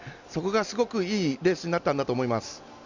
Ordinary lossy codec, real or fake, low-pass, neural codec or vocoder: Opus, 64 kbps; real; 7.2 kHz; none